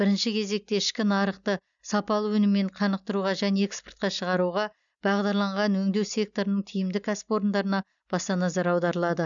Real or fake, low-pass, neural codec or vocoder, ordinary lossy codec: real; 7.2 kHz; none; none